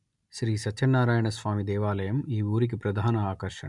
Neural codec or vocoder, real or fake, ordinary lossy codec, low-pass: none; real; none; 10.8 kHz